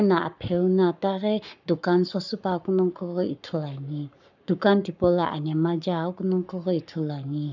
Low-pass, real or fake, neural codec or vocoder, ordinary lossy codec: 7.2 kHz; fake; codec, 44.1 kHz, 7.8 kbps, Pupu-Codec; none